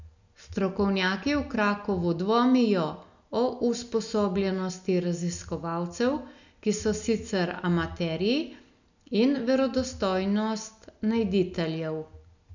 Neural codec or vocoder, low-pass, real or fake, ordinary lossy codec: none; 7.2 kHz; real; none